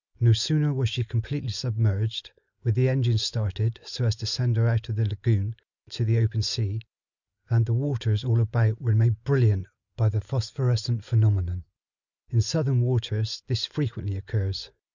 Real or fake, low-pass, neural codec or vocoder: real; 7.2 kHz; none